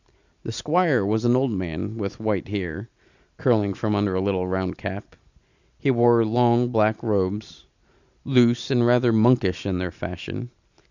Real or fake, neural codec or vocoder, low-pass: real; none; 7.2 kHz